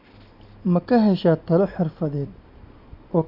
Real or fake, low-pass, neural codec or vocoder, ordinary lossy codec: real; 5.4 kHz; none; none